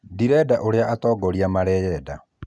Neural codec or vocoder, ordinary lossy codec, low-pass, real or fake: none; none; none; real